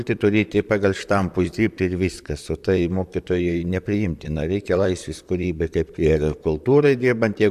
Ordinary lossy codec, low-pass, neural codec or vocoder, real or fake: AAC, 96 kbps; 14.4 kHz; vocoder, 44.1 kHz, 128 mel bands, Pupu-Vocoder; fake